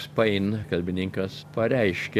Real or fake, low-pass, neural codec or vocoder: real; 14.4 kHz; none